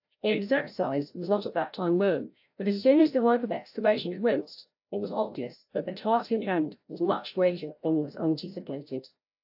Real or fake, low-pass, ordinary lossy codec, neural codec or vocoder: fake; 5.4 kHz; AAC, 48 kbps; codec, 16 kHz, 0.5 kbps, FreqCodec, larger model